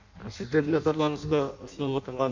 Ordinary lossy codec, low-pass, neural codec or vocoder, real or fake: MP3, 64 kbps; 7.2 kHz; codec, 16 kHz in and 24 kHz out, 0.6 kbps, FireRedTTS-2 codec; fake